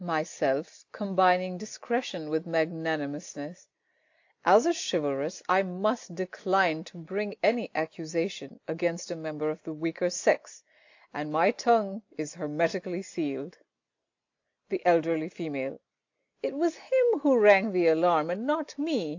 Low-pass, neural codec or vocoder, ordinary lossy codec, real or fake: 7.2 kHz; none; AAC, 48 kbps; real